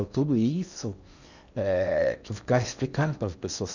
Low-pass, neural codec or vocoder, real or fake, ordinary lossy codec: 7.2 kHz; codec, 16 kHz in and 24 kHz out, 0.8 kbps, FocalCodec, streaming, 65536 codes; fake; none